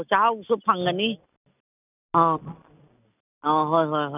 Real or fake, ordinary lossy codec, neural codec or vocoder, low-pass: real; none; none; 3.6 kHz